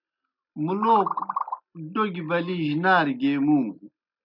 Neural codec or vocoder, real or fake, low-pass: none; real; 5.4 kHz